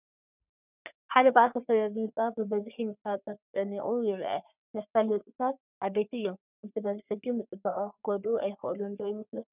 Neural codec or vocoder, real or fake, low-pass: codec, 44.1 kHz, 3.4 kbps, Pupu-Codec; fake; 3.6 kHz